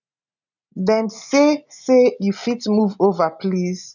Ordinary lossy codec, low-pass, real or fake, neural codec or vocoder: none; 7.2 kHz; real; none